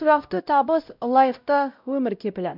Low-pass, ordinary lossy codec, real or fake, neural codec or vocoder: 5.4 kHz; none; fake; codec, 16 kHz, 0.5 kbps, X-Codec, WavLM features, trained on Multilingual LibriSpeech